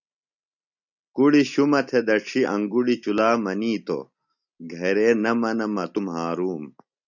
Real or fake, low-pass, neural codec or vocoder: real; 7.2 kHz; none